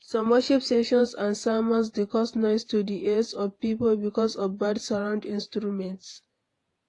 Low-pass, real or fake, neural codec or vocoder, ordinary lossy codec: 10.8 kHz; fake; vocoder, 48 kHz, 128 mel bands, Vocos; AAC, 48 kbps